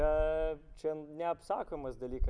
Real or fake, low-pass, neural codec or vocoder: real; 9.9 kHz; none